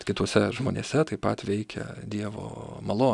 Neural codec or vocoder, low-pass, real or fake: none; 10.8 kHz; real